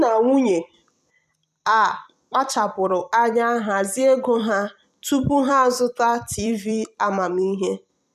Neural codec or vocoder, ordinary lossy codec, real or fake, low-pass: vocoder, 44.1 kHz, 128 mel bands every 256 samples, BigVGAN v2; none; fake; 14.4 kHz